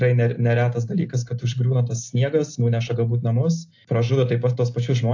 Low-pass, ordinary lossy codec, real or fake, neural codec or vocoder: 7.2 kHz; AAC, 48 kbps; real; none